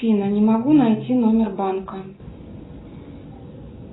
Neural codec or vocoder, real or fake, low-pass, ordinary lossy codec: none; real; 7.2 kHz; AAC, 16 kbps